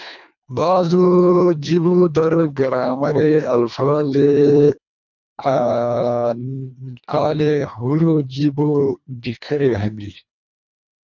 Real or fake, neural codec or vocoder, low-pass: fake; codec, 24 kHz, 1.5 kbps, HILCodec; 7.2 kHz